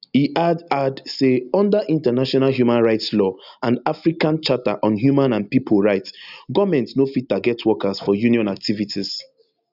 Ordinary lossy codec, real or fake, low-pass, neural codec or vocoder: none; real; 5.4 kHz; none